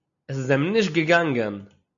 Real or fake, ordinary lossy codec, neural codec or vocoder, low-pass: real; AAC, 64 kbps; none; 7.2 kHz